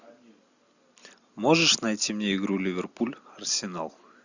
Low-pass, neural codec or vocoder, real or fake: 7.2 kHz; none; real